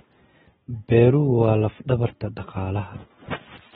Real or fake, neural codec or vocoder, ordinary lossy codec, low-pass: real; none; AAC, 16 kbps; 19.8 kHz